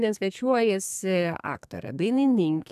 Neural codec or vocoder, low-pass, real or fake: codec, 32 kHz, 1.9 kbps, SNAC; 14.4 kHz; fake